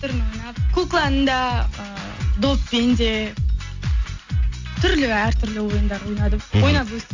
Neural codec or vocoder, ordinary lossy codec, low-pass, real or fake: none; AAC, 48 kbps; 7.2 kHz; real